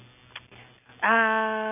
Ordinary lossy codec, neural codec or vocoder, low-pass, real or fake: none; none; 3.6 kHz; real